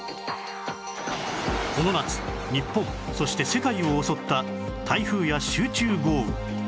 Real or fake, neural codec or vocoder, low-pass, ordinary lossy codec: real; none; none; none